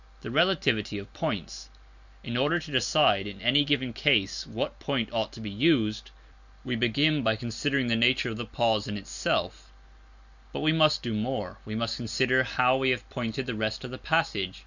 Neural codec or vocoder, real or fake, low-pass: none; real; 7.2 kHz